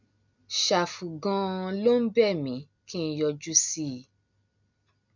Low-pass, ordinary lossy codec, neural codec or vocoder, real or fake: 7.2 kHz; none; none; real